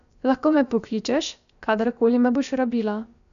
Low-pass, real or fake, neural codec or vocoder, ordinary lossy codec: 7.2 kHz; fake; codec, 16 kHz, about 1 kbps, DyCAST, with the encoder's durations; none